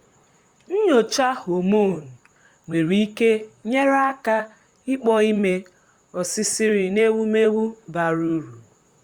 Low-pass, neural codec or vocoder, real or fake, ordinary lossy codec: 19.8 kHz; vocoder, 44.1 kHz, 128 mel bands, Pupu-Vocoder; fake; Opus, 64 kbps